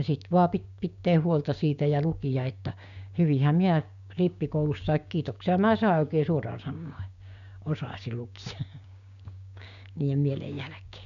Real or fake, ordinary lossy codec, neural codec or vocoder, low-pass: fake; none; codec, 16 kHz, 6 kbps, DAC; 7.2 kHz